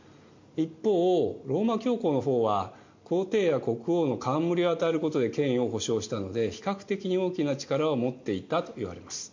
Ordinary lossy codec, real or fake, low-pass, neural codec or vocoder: MP3, 48 kbps; real; 7.2 kHz; none